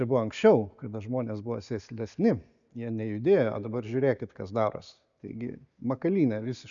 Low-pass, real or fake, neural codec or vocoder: 7.2 kHz; real; none